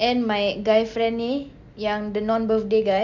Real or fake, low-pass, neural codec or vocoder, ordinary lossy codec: real; 7.2 kHz; none; MP3, 64 kbps